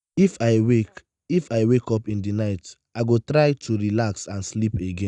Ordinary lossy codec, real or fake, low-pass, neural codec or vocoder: none; real; 10.8 kHz; none